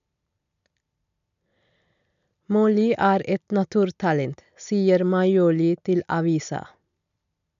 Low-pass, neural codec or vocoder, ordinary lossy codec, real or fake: 7.2 kHz; none; none; real